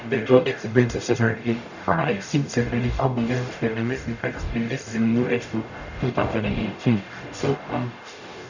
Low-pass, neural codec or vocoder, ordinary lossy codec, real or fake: 7.2 kHz; codec, 44.1 kHz, 0.9 kbps, DAC; none; fake